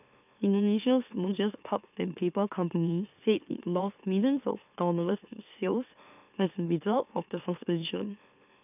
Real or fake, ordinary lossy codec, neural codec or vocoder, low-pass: fake; none; autoencoder, 44.1 kHz, a latent of 192 numbers a frame, MeloTTS; 3.6 kHz